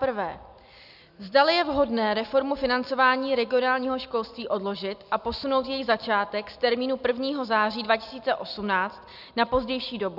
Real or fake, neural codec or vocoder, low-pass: real; none; 5.4 kHz